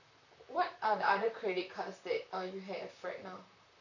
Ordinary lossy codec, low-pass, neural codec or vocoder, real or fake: none; 7.2 kHz; vocoder, 44.1 kHz, 128 mel bands, Pupu-Vocoder; fake